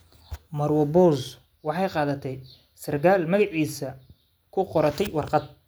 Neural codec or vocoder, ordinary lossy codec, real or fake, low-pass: none; none; real; none